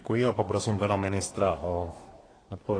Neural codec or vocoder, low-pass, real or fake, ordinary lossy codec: codec, 24 kHz, 1 kbps, SNAC; 9.9 kHz; fake; AAC, 32 kbps